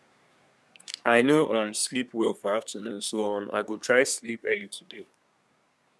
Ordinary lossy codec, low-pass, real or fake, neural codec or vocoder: none; none; fake; codec, 24 kHz, 1 kbps, SNAC